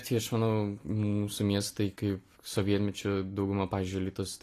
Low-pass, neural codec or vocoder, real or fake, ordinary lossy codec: 14.4 kHz; none; real; AAC, 48 kbps